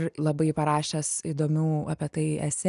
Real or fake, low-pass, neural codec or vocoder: real; 10.8 kHz; none